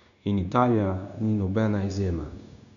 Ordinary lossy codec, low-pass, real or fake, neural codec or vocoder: none; 7.2 kHz; fake; codec, 16 kHz, 0.9 kbps, LongCat-Audio-Codec